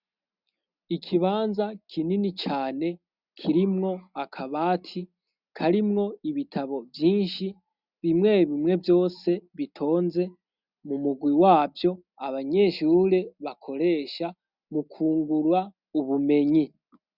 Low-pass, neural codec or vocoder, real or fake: 5.4 kHz; none; real